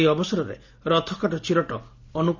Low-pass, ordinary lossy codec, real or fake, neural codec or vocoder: 7.2 kHz; none; real; none